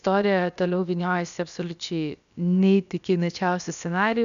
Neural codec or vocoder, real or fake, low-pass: codec, 16 kHz, about 1 kbps, DyCAST, with the encoder's durations; fake; 7.2 kHz